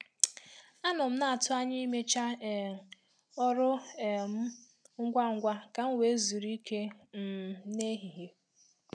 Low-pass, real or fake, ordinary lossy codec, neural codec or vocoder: 9.9 kHz; real; none; none